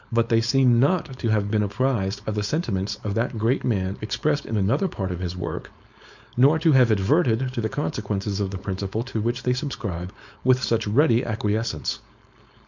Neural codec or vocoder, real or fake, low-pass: codec, 16 kHz, 4.8 kbps, FACodec; fake; 7.2 kHz